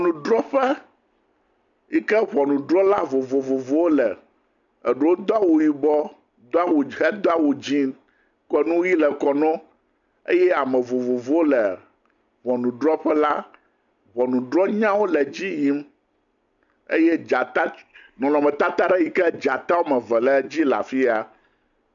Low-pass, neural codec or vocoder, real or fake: 7.2 kHz; none; real